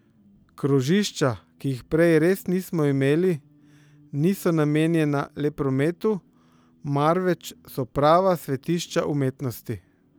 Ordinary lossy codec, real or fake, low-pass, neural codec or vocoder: none; real; none; none